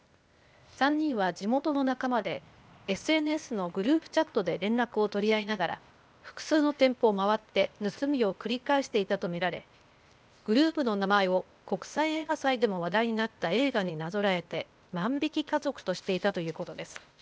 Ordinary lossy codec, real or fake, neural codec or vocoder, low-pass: none; fake; codec, 16 kHz, 0.8 kbps, ZipCodec; none